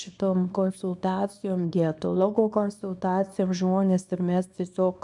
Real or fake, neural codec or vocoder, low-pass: fake; codec, 24 kHz, 0.9 kbps, WavTokenizer, small release; 10.8 kHz